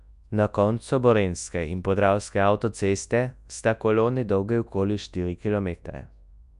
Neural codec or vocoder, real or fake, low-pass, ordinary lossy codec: codec, 24 kHz, 0.9 kbps, WavTokenizer, large speech release; fake; 10.8 kHz; MP3, 96 kbps